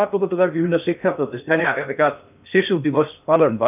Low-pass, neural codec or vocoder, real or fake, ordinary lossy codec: 3.6 kHz; codec, 16 kHz in and 24 kHz out, 0.6 kbps, FocalCodec, streaming, 2048 codes; fake; none